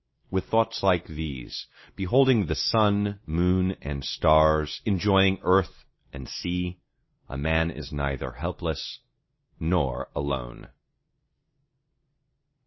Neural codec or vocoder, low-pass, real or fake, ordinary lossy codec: none; 7.2 kHz; real; MP3, 24 kbps